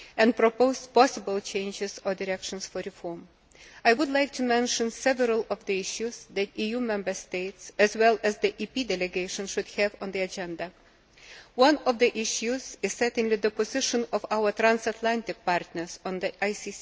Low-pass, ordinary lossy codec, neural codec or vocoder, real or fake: none; none; none; real